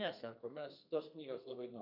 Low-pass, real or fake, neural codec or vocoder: 5.4 kHz; fake; codec, 32 kHz, 1.9 kbps, SNAC